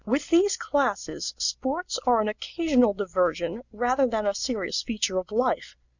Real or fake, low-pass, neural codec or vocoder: real; 7.2 kHz; none